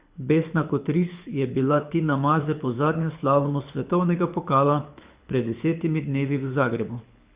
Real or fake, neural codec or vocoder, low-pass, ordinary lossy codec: fake; codec, 24 kHz, 6 kbps, HILCodec; 3.6 kHz; Opus, 64 kbps